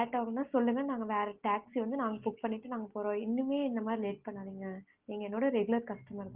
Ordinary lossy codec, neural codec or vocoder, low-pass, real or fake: Opus, 16 kbps; none; 3.6 kHz; real